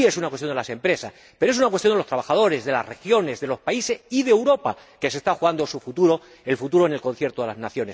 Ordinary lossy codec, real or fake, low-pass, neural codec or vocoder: none; real; none; none